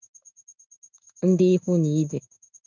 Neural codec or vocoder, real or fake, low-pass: codec, 16 kHz in and 24 kHz out, 1 kbps, XY-Tokenizer; fake; 7.2 kHz